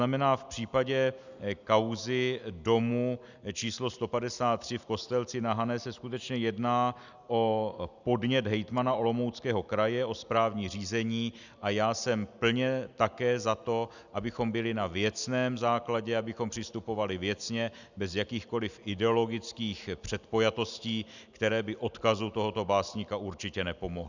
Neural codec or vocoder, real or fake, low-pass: none; real; 7.2 kHz